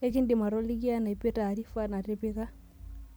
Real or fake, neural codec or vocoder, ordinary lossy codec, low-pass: real; none; none; none